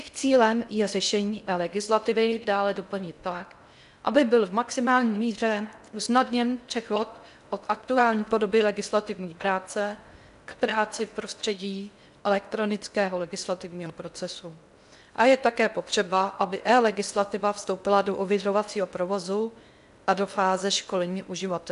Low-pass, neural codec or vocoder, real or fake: 10.8 kHz; codec, 16 kHz in and 24 kHz out, 0.6 kbps, FocalCodec, streaming, 4096 codes; fake